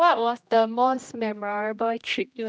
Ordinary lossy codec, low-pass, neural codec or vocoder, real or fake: none; none; codec, 16 kHz, 1 kbps, X-Codec, HuBERT features, trained on general audio; fake